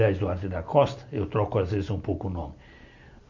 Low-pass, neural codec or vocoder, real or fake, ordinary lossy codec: 7.2 kHz; none; real; none